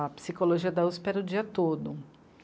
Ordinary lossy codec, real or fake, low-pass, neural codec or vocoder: none; real; none; none